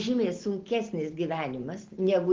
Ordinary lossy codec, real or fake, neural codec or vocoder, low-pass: Opus, 16 kbps; real; none; 7.2 kHz